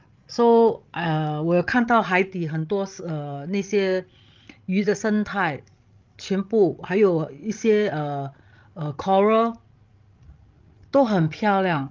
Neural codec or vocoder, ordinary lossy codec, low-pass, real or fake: codec, 16 kHz, 16 kbps, FreqCodec, larger model; Opus, 32 kbps; 7.2 kHz; fake